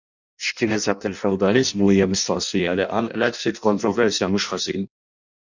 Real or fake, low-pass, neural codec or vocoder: fake; 7.2 kHz; codec, 16 kHz in and 24 kHz out, 0.6 kbps, FireRedTTS-2 codec